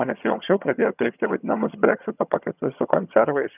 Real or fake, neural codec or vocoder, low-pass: fake; vocoder, 22.05 kHz, 80 mel bands, HiFi-GAN; 3.6 kHz